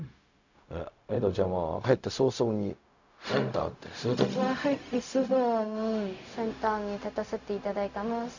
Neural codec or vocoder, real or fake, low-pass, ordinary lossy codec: codec, 16 kHz, 0.4 kbps, LongCat-Audio-Codec; fake; 7.2 kHz; none